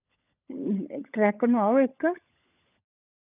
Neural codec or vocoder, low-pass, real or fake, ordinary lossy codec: codec, 16 kHz, 16 kbps, FunCodec, trained on LibriTTS, 50 frames a second; 3.6 kHz; fake; none